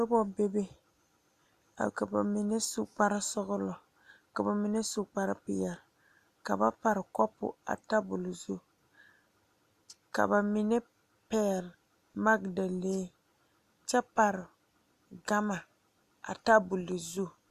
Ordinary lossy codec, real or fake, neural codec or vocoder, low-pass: Opus, 64 kbps; real; none; 14.4 kHz